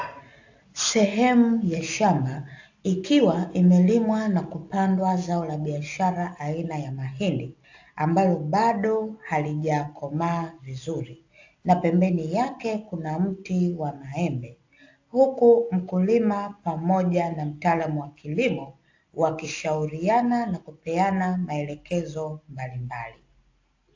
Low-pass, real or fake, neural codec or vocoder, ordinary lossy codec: 7.2 kHz; real; none; AAC, 48 kbps